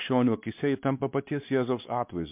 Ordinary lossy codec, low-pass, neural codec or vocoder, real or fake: MP3, 32 kbps; 3.6 kHz; codec, 16 kHz, 2 kbps, X-Codec, WavLM features, trained on Multilingual LibriSpeech; fake